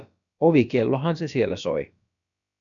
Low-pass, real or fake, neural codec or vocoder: 7.2 kHz; fake; codec, 16 kHz, about 1 kbps, DyCAST, with the encoder's durations